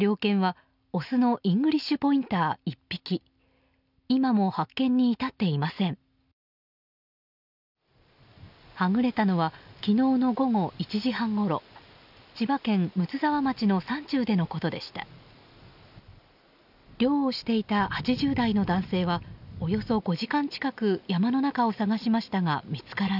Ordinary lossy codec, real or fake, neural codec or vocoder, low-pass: none; real; none; 5.4 kHz